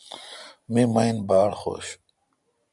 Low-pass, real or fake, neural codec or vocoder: 10.8 kHz; real; none